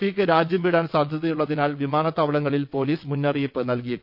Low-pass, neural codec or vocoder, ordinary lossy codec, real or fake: 5.4 kHz; vocoder, 22.05 kHz, 80 mel bands, WaveNeXt; none; fake